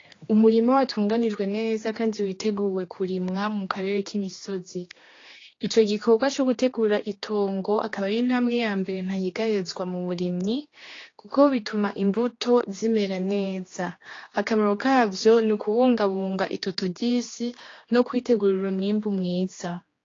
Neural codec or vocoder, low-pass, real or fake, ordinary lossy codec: codec, 16 kHz, 2 kbps, X-Codec, HuBERT features, trained on general audio; 7.2 kHz; fake; AAC, 32 kbps